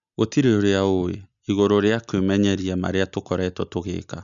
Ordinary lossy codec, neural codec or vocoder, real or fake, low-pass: none; none; real; 7.2 kHz